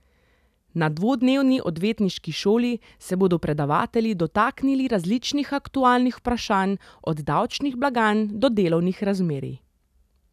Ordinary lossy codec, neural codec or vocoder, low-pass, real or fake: none; none; 14.4 kHz; real